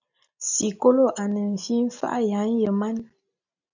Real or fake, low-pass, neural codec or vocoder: real; 7.2 kHz; none